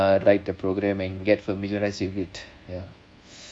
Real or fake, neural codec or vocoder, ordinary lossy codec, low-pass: fake; codec, 24 kHz, 1.2 kbps, DualCodec; Opus, 64 kbps; 9.9 kHz